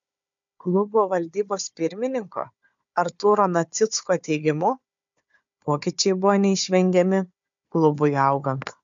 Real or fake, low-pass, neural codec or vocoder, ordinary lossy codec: fake; 7.2 kHz; codec, 16 kHz, 4 kbps, FunCodec, trained on Chinese and English, 50 frames a second; MP3, 64 kbps